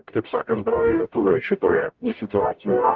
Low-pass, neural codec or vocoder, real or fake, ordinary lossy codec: 7.2 kHz; codec, 44.1 kHz, 0.9 kbps, DAC; fake; Opus, 32 kbps